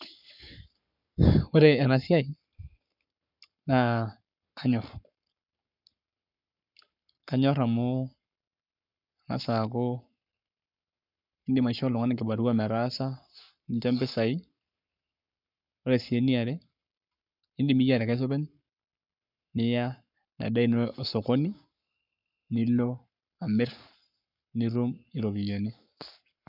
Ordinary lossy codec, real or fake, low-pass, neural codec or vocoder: none; fake; 5.4 kHz; codec, 44.1 kHz, 7.8 kbps, Pupu-Codec